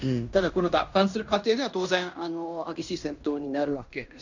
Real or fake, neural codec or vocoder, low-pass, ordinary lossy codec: fake; codec, 16 kHz in and 24 kHz out, 0.9 kbps, LongCat-Audio-Codec, fine tuned four codebook decoder; 7.2 kHz; none